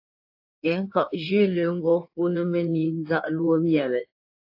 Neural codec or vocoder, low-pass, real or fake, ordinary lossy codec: codec, 16 kHz in and 24 kHz out, 1.1 kbps, FireRedTTS-2 codec; 5.4 kHz; fake; AAC, 32 kbps